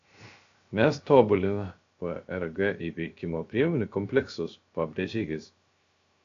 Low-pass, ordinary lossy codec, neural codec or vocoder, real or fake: 7.2 kHz; AAC, 48 kbps; codec, 16 kHz, 0.3 kbps, FocalCodec; fake